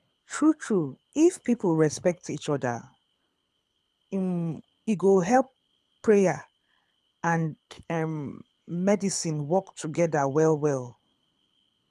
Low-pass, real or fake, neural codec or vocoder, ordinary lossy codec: none; fake; codec, 24 kHz, 6 kbps, HILCodec; none